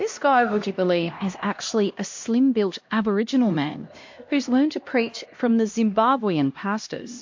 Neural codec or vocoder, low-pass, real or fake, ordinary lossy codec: codec, 16 kHz, 1 kbps, X-Codec, HuBERT features, trained on LibriSpeech; 7.2 kHz; fake; MP3, 48 kbps